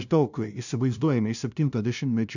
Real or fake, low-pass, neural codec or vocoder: fake; 7.2 kHz; codec, 16 kHz, 0.5 kbps, FunCodec, trained on LibriTTS, 25 frames a second